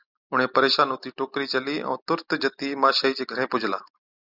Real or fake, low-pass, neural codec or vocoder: real; 5.4 kHz; none